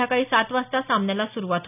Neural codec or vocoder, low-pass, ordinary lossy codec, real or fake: none; 3.6 kHz; none; real